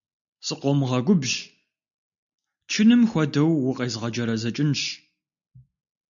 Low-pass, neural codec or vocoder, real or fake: 7.2 kHz; none; real